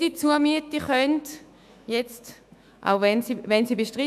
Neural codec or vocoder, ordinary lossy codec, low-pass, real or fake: autoencoder, 48 kHz, 128 numbers a frame, DAC-VAE, trained on Japanese speech; none; 14.4 kHz; fake